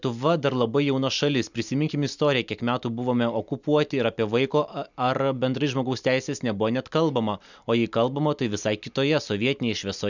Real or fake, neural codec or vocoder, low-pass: real; none; 7.2 kHz